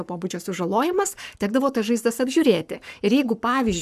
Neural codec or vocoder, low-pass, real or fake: codec, 44.1 kHz, 7.8 kbps, Pupu-Codec; 14.4 kHz; fake